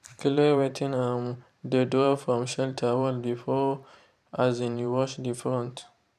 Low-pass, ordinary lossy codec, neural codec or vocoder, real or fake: 14.4 kHz; none; vocoder, 44.1 kHz, 128 mel bands every 512 samples, BigVGAN v2; fake